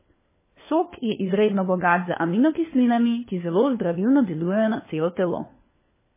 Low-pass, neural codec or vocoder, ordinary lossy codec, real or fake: 3.6 kHz; codec, 16 kHz, 4 kbps, FunCodec, trained on LibriTTS, 50 frames a second; MP3, 16 kbps; fake